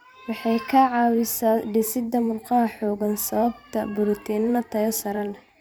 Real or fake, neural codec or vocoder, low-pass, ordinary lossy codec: fake; vocoder, 44.1 kHz, 128 mel bands every 512 samples, BigVGAN v2; none; none